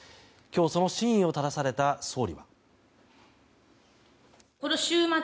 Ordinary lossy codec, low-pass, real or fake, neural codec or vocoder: none; none; real; none